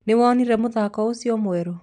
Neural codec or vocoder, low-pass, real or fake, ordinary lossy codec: none; 9.9 kHz; real; MP3, 96 kbps